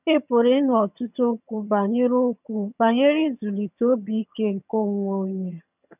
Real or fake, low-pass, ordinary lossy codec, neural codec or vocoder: fake; 3.6 kHz; none; vocoder, 22.05 kHz, 80 mel bands, HiFi-GAN